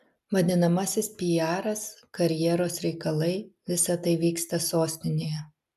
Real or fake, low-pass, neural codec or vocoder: real; 14.4 kHz; none